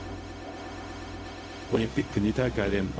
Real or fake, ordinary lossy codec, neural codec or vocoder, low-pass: fake; none; codec, 16 kHz, 0.4 kbps, LongCat-Audio-Codec; none